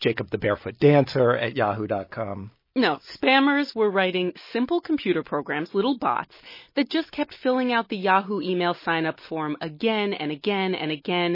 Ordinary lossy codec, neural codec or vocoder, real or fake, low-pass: MP3, 24 kbps; none; real; 5.4 kHz